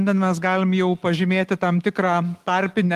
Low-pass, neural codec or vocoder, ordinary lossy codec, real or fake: 14.4 kHz; none; Opus, 24 kbps; real